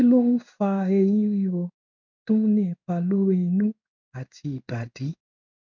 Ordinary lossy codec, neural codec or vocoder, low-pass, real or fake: none; codec, 16 kHz in and 24 kHz out, 1 kbps, XY-Tokenizer; 7.2 kHz; fake